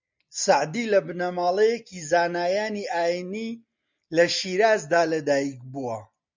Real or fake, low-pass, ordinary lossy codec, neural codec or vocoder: real; 7.2 kHz; MP3, 64 kbps; none